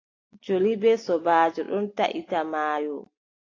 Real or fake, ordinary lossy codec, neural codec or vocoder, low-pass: real; AAC, 32 kbps; none; 7.2 kHz